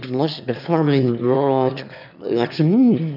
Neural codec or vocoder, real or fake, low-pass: autoencoder, 22.05 kHz, a latent of 192 numbers a frame, VITS, trained on one speaker; fake; 5.4 kHz